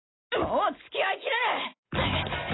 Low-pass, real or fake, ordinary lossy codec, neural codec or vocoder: 7.2 kHz; real; AAC, 16 kbps; none